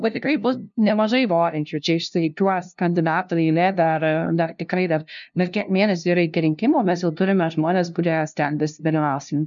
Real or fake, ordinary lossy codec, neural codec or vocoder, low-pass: fake; MP3, 64 kbps; codec, 16 kHz, 0.5 kbps, FunCodec, trained on LibriTTS, 25 frames a second; 7.2 kHz